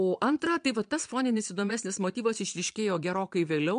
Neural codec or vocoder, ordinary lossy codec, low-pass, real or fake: vocoder, 22.05 kHz, 80 mel bands, Vocos; MP3, 64 kbps; 9.9 kHz; fake